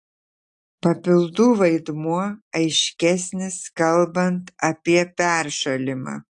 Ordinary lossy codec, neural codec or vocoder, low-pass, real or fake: AAC, 64 kbps; none; 10.8 kHz; real